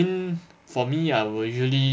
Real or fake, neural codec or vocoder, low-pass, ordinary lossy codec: real; none; none; none